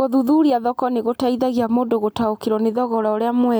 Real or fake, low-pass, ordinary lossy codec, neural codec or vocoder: real; none; none; none